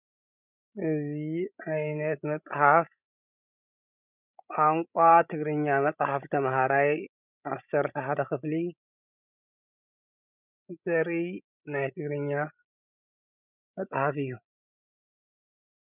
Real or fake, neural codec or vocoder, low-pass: fake; codec, 16 kHz, 16 kbps, FreqCodec, larger model; 3.6 kHz